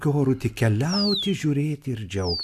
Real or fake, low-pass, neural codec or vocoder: real; 14.4 kHz; none